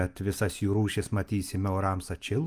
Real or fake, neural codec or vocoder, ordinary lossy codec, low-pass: real; none; Opus, 32 kbps; 14.4 kHz